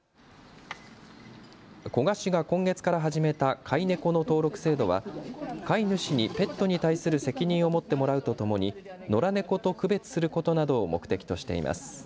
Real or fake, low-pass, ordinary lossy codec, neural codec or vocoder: real; none; none; none